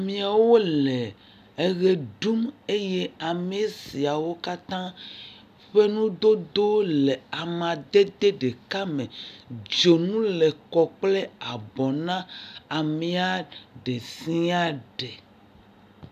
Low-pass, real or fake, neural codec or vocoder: 14.4 kHz; real; none